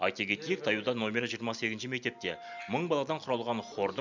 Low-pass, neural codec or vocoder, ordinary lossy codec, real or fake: 7.2 kHz; none; none; real